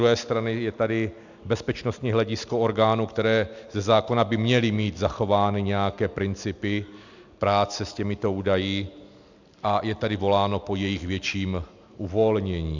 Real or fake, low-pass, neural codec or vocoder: real; 7.2 kHz; none